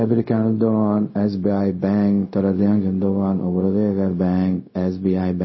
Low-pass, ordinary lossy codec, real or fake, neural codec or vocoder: 7.2 kHz; MP3, 24 kbps; fake; codec, 16 kHz, 0.4 kbps, LongCat-Audio-Codec